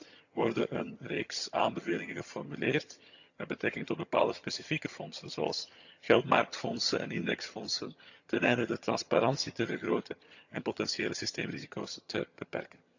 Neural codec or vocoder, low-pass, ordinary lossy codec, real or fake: vocoder, 22.05 kHz, 80 mel bands, HiFi-GAN; 7.2 kHz; none; fake